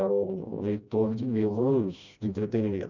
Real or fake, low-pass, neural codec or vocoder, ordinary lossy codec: fake; 7.2 kHz; codec, 16 kHz, 1 kbps, FreqCodec, smaller model; none